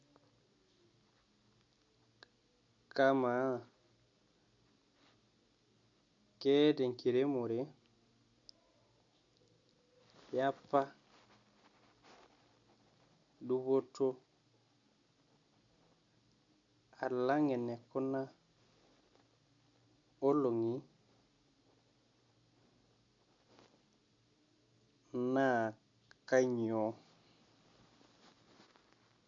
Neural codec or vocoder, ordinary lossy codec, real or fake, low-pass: none; MP3, 48 kbps; real; 7.2 kHz